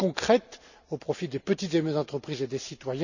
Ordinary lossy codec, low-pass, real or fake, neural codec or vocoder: none; 7.2 kHz; real; none